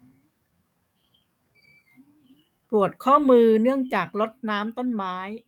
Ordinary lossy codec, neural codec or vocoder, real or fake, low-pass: none; codec, 44.1 kHz, 7.8 kbps, DAC; fake; 19.8 kHz